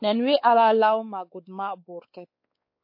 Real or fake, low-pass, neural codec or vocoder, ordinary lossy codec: fake; 5.4 kHz; codec, 16 kHz, 4 kbps, X-Codec, WavLM features, trained on Multilingual LibriSpeech; MP3, 32 kbps